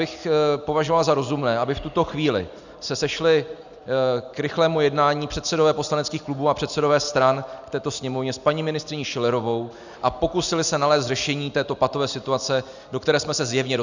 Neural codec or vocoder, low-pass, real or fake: none; 7.2 kHz; real